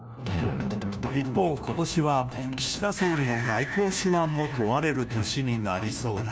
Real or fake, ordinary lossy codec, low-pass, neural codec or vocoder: fake; none; none; codec, 16 kHz, 1 kbps, FunCodec, trained on LibriTTS, 50 frames a second